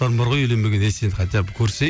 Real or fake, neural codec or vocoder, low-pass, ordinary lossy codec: real; none; none; none